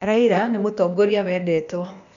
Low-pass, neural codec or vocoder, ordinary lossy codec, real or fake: 7.2 kHz; codec, 16 kHz, 0.8 kbps, ZipCodec; none; fake